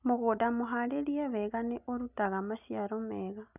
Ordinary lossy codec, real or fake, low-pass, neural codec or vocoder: none; real; 3.6 kHz; none